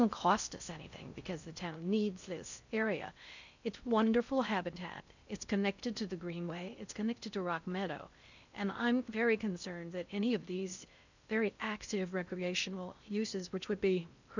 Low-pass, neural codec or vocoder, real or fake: 7.2 kHz; codec, 16 kHz in and 24 kHz out, 0.6 kbps, FocalCodec, streaming, 4096 codes; fake